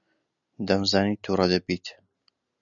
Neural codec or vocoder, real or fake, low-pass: none; real; 7.2 kHz